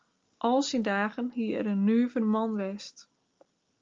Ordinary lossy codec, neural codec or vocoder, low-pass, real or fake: Opus, 32 kbps; none; 7.2 kHz; real